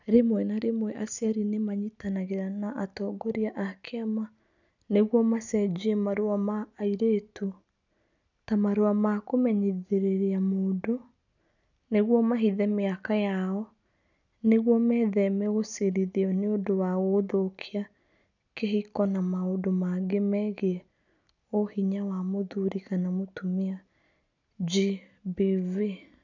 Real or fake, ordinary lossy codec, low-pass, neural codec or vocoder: real; none; 7.2 kHz; none